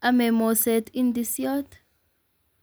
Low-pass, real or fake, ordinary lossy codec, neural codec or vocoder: none; real; none; none